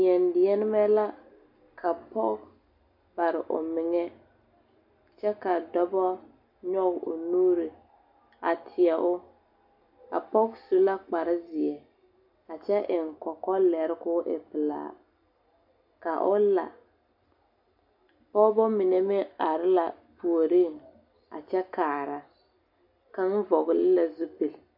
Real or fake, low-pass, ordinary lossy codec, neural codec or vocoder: real; 5.4 kHz; AAC, 48 kbps; none